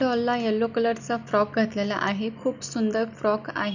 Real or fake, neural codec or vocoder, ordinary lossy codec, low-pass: real; none; none; 7.2 kHz